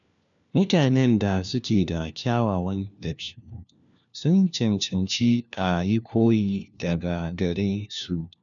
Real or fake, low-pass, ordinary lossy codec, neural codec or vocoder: fake; 7.2 kHz; none; codec, 16 kHz, 1 kbps, FunCodec, trained on LibriTTS, 50 frames a second